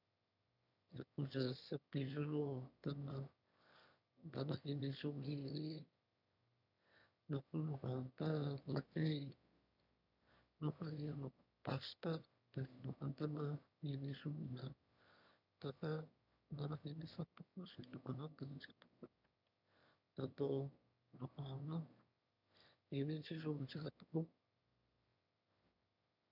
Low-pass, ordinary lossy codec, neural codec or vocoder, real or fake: 5.4 kHz; none; autoencoder, 22.05 kHz, a latent of 192 numbers a frame, VITS, trained on one speaker; fake